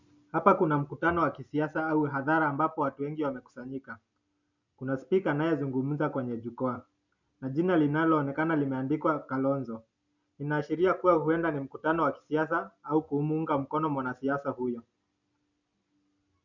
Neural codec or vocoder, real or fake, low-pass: none; real; 7.2 kHz